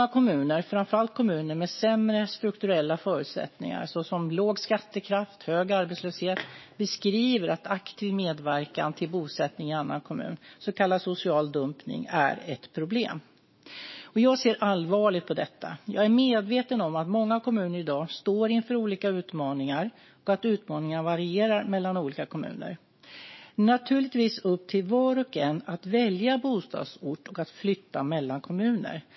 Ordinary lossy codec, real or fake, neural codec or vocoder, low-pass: MP3, 24 kbps; fake; autoencoder, 48 kHz, 128 numbers a frame, DAC-VAE, trained on Japanese speech; 7.2 kHz